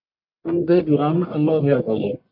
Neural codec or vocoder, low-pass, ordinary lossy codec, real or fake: codec, 44.1 kHz, 1.7 kbps, Pupu-Codec; 5.4 kHz; AAC, 48 kbps; fake